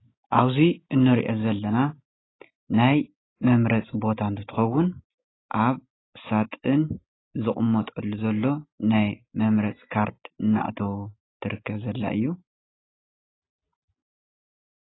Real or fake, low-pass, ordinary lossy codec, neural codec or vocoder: real; 7.2 kHz; AAC, 16 kbps; none